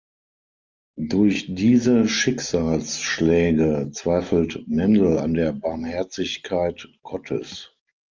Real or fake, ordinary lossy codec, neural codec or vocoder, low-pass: real; Opus, 32 kbps; none; 7.2 kHz